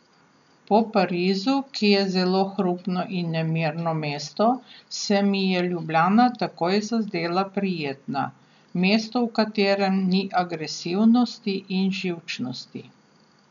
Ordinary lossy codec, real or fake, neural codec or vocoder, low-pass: none; real; none; 7.2 kHz